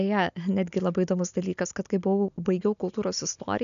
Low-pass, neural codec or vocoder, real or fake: 7.2 kHz; none; real